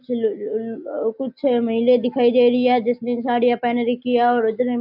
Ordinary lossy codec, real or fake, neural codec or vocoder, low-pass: AAC, 48 kbps; real; none; 5.4 kHz